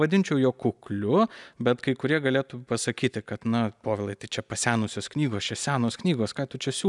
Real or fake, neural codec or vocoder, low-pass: real; none; 10.8 kHz